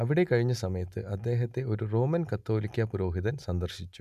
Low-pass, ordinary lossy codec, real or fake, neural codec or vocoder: 14.4 kHz; none; real; none